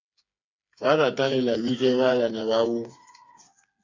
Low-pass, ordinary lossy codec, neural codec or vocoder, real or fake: 7.2 kHz; MP3, 64 kbps; codec, 16 kHz, 4 kbps, FreqCodec, smaller model; fake